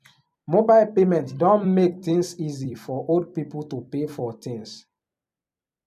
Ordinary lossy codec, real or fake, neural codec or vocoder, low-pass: none; fake; vocoder, 44.1 kHz, 128 mel bands every 256 samples, BigVGAN v2; 14.4 kHz